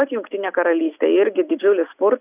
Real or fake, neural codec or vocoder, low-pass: real; none; 3.6 kHz